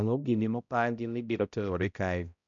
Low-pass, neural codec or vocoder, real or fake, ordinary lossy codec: 7.2 kHz; codec, 16 kHz, 0.5 kbps, X-Codec, HuBERT features, trained on balanced general audio; fake; MP3, 96 kbps